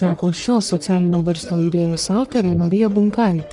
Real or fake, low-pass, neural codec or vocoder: fake; 10.8 kHz; codec, 44.1 kHz, 1.7 kbps, Pupu-Codec